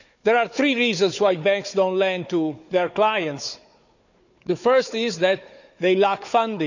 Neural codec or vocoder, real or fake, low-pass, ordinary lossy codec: codec, 16 kHz, 4 kbps, FunCodec, trained on Chinese and English, 50 frames a second; fake; 7.2 kHz; none